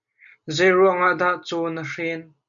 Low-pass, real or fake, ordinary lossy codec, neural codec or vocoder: 7.2 kHz; real; MP3, 96 kbps; none